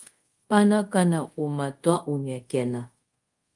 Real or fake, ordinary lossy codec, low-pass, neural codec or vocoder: fake; Opus, 32 kbps; 10.8 kHz; codec, 24 kHz, 0.5 kbps, DualCodec